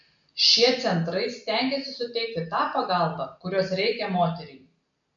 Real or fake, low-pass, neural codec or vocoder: real; 7.2 kHz; none